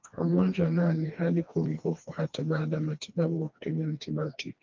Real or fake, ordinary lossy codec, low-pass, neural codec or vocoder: fake; Opus, 24 kbps; 7.2 kHz; codec, 16 kHz, 2 kbps, FreqCodec, smaller model